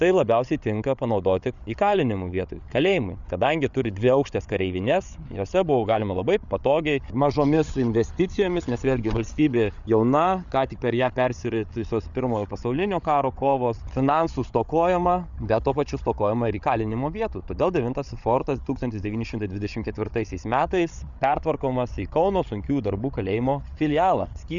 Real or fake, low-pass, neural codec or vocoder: fake; 7.2 kHz; codec, 16 kHz, 8 kbps, FreqCodec, larger model